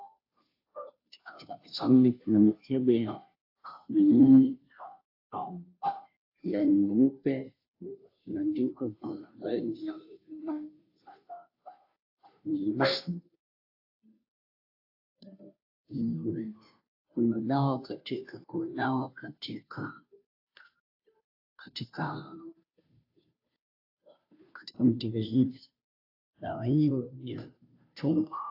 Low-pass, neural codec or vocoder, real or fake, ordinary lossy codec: 5.4 kHz; codec, 16 kHz, 0.5 kbps, FunCodec, trained on Chinese and English, 25 frames a second; fake; AAC, 32 kbps